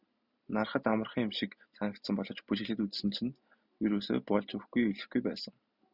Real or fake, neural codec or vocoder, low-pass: real; none; 5.4 kHz